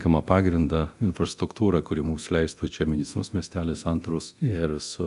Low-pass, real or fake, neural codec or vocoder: 10.8 kHz; fake; codec, 24 kHz, 0.9 kbps, DualCodec